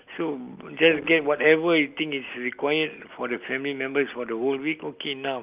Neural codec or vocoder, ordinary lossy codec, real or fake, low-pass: none; Opus, 32 kbps; real; 3.6 kHz